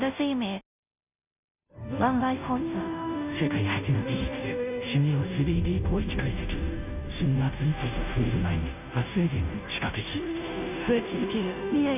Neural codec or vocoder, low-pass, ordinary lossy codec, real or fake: codec, 16 kHz, 0.5 kbps, FunCodec, trained on Chinese and English, 25 frames a second; 3.6 kHz; none; fake